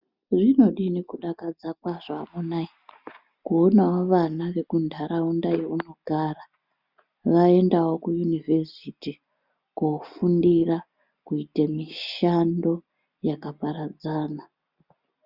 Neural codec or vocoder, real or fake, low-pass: none; real; 5.4 kHz